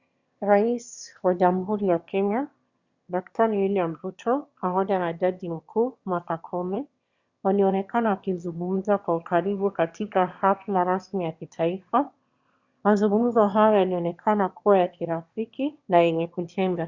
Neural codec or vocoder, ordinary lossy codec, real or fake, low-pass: autoencoder, 22.05 kHz, a latent of 192 numbers a frame, VITS, trained on one speaker; Opus, 64 kbps; fake; 7.2 kHz